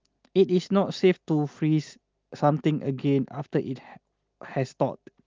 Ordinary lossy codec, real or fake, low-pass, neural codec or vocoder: Opus, 32 kbps; real; 7.2 kHz; none